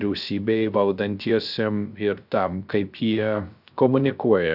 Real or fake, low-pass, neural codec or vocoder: fake; 5.4 kHz; codec, 16 kHz, 0.3 kbps, FocalCodec